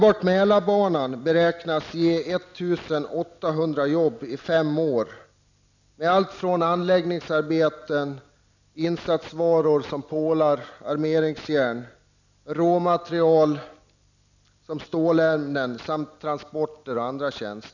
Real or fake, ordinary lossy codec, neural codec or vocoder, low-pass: real; none; none; 7.2 kHz